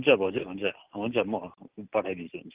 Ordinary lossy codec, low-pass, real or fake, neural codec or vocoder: Opus, 16 kbps; 3.6 kHz; real; none